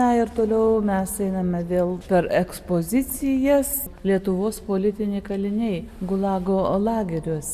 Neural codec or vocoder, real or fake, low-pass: none; real; 14.4 kHz